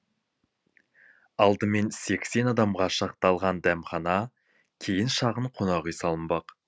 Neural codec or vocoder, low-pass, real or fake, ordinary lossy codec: none; none; real; none